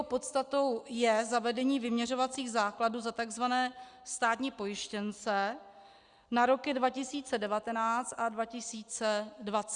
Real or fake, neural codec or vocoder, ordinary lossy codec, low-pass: real; none; Opus, 64 kbps; 9.9 kHz